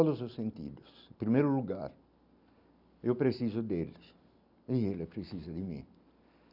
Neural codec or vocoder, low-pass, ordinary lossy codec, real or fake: none; 5.4 kHz; none; real